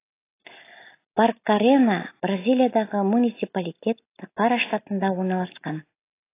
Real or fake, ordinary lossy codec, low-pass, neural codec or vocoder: real; AAC, 24 kbps; 3.6 kHz; none